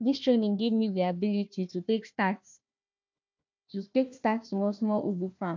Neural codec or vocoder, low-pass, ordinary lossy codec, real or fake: codec, 16 kHz, 1 kbps, FunCodec, trained on Chinese and English, 50 frames a second; 7.2 kHz; MP3, 64 kbps; fake